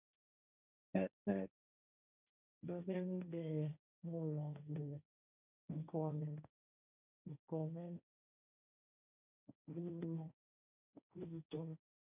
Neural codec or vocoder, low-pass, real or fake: codec, 16 kHz, 1.1 kbps, Voila-Tokenizer; 3.6 kHz; fake